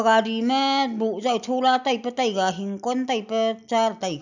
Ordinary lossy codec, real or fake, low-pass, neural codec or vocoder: none; real; 7.2 kHz; none